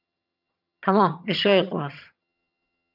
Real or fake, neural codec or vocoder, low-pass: fake; vocoder, 22.05 kHz, 80 mel bands, HiFi-GAN; 5.4 kHz